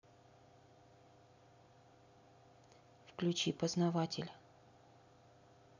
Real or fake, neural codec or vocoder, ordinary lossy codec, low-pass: real; none; none; 7.2 kHz